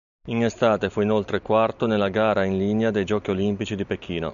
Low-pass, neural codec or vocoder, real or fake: 7.2 kHz; none; real